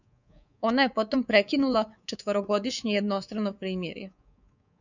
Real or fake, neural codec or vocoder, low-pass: fake; codec, 24 kHz, 3.1 kbps, DualCodec; 7.2 kHz